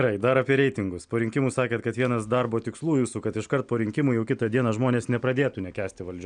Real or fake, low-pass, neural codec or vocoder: real; 9.9 kHz; none